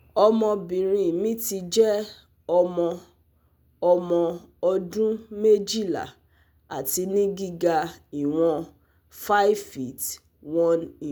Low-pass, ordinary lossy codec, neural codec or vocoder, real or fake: none; none; none; real